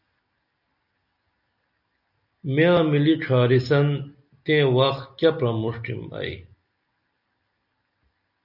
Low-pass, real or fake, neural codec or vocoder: 5.4 kHz; real; none